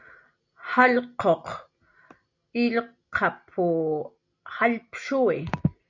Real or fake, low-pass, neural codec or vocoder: real; 7.2 kHz; none